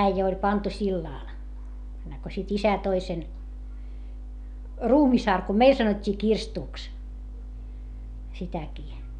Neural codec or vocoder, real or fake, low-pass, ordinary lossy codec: none; real; 10.8 kHz; none